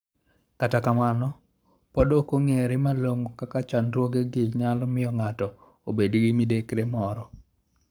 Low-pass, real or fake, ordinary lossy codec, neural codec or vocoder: none; fake; none; codec, 44.1 kHz, 7.8 kbps, Pupu-Codec